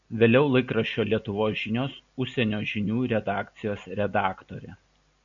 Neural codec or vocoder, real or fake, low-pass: none; real; 7.2 kHz